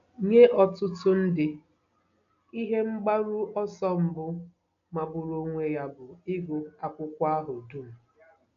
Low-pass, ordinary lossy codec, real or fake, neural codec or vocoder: 7.2 kHz; AAC, 96 kbps; real; none